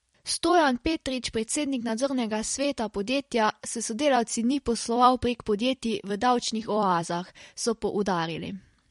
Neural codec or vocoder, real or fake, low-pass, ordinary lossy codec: vocoder, 44.1 kHz, 128 mel bands every 256 samples, BigVGAN v2; fake; 19.8 kHz; MP3, 48 kbps